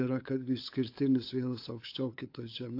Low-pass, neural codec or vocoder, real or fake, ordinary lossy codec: 5.4 kHz; codec, 16 kHz, 8 kbps, FunCodec, trained on LibriTTS, 25 frames a second; fake; AAC, 32 kbps